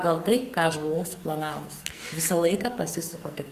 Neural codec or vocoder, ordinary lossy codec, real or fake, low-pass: codec, 44.1 kHz, 2.6 kbps, SNAC; Opus, 64 kbps; fake; 14.4 kHz